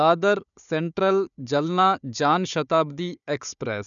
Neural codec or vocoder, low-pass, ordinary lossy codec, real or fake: codec, 16 kHz, 4 kbps, FunCodec, trained on Chinese and English, 50 frames a second; 7.2 kHz; none; fake